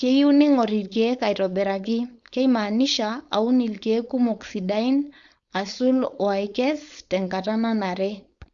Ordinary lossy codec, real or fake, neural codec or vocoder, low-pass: Opus, 64 kbps; fake; codec, 16 kHz, 4.8 kbps, FACodec; 7.2 kHz